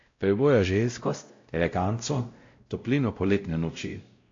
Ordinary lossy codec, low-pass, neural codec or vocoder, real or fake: AAC, 48 kbps; 7.2 kHz; codec, 16 kHz, 0.5 kbps, X-Codec, WavLM features, trained on Multilingual LibriSpeech; fake